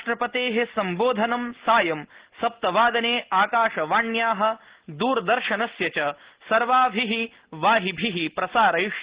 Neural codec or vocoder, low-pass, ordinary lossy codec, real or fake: none; 3.6 kHz; Opus, 16 kbps; real